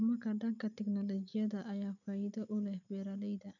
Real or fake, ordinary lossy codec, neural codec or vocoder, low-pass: real; none; none; 7.2 kHz